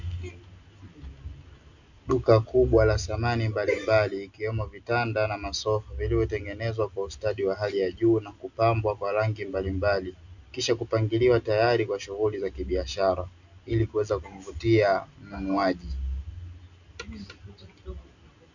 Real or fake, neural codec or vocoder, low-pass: real; none; 7.2 kHz